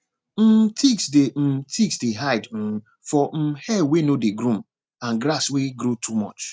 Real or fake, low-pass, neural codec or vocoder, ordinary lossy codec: real; none; none; none